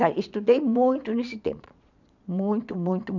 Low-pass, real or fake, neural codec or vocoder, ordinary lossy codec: 7.2 kHz; fake; vocoder, 22.05 kHz, 80 mel bands, Vocos; none